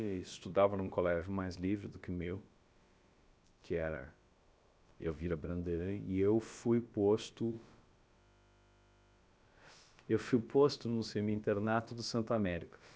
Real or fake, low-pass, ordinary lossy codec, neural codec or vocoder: fake; none; none; codec, 16 kHz, about 1 kbps, DyCAST, with the encoder's durations